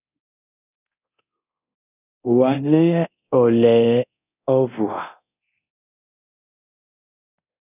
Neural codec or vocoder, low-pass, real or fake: codec, 24 kHz, 0.9 kbps, DualCodec; 3.6 kHz; fake